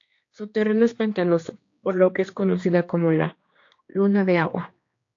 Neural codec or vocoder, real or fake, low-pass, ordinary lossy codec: codec, 16 kHz, 2 kbps, X-Codec, HuBERT features, trained on general audio; fake; 7.2 kHz; AAC, 48 kbps